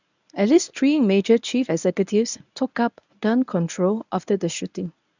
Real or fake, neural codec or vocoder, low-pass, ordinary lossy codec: fake; codec, 24 kHz, 0.9 kbps, WavTokenizer, medium speech release version 1; 7.2 kHz; none